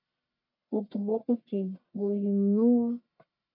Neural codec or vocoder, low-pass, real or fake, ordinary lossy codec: codec, 44.1 kHz, 1.7 kbps, Pupu-Codec; 5.4 kHz; fake; MP3, 48 kbps